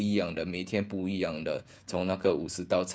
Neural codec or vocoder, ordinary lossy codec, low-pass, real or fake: codec, 16 kHz, 16 kbps, FreqCodec, smaller model; none; none; fake